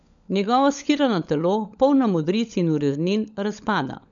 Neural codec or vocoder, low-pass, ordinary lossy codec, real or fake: codec, 16 kHz, 16 kbps, FunCodec, trained on LibriTTS, 50 frames a second; 7.2 kHz; none; fake